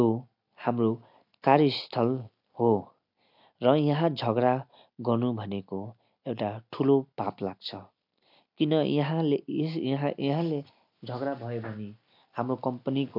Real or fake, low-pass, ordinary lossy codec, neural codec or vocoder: real; 5.4 kHz; MP3, 48 kbps; none